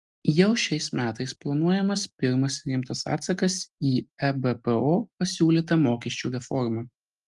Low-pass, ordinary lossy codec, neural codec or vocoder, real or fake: 10.8 kHz; Opus, 32 kbps; none; real